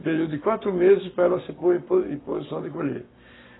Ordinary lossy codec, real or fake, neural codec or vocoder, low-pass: AAC, 16 kbps; fake; codec, 44.1 kHz, 7.8 kbps, Pupu-Codec; 7.2 kHz